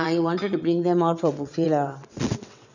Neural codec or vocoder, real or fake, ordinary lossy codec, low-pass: vocoder, 22.05 kHz, 80 mel bands, WaveNeXt; fake; none; 7.2 kHz